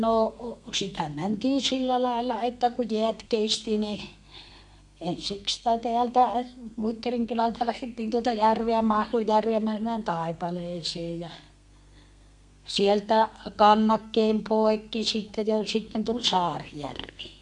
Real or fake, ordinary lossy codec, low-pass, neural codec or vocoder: fake; none; 10.8 kHz; codec, 32 kHz, 1.9 kbps, SNAC